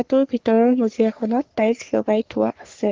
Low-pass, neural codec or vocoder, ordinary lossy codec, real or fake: 7.2 kHz; codec, 44.1 kHz, 3.4 kbps, Pupu-Codec; Opus, 16 kbps; fake